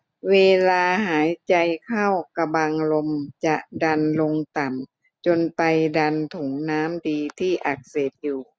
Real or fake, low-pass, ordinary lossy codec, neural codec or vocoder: real; none; none; none